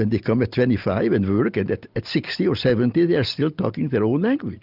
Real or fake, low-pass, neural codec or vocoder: real; 5.4 kHz; none